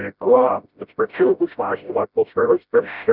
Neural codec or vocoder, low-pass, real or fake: codec, 16 kHz, 0.5 kbps, FreqCodec, smaller model; 5.4 kHz; fake